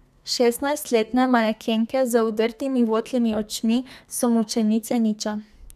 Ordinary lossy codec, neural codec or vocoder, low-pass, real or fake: none; codec, 32 kHz, 1.9 kbps, SNAC; 14.4 kHz; fake